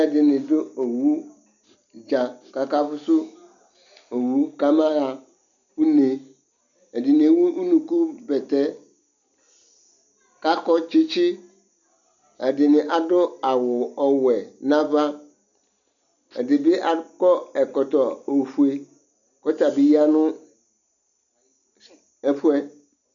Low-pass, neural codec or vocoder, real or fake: 7.2 kHz; none; real